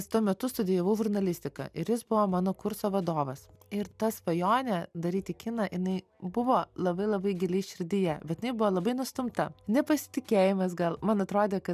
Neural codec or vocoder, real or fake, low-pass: none; real; 14.4 kHz